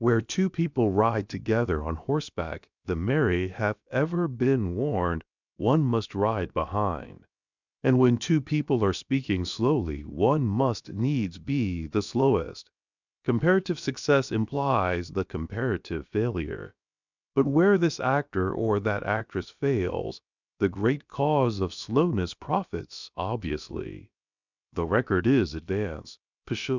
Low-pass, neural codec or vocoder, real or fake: 7.2 kHz; codec, 16 kHz, about 1 kbps, DyCAST, with the encoder's durations; fake